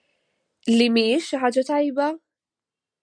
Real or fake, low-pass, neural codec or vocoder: real; 9.9 kHz; none